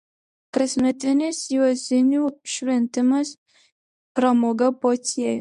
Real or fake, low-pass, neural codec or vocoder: fake; 10.8 kHz; codec, 24 kHz, 0.9 kbps, WavTokenizer, medium speech release version 1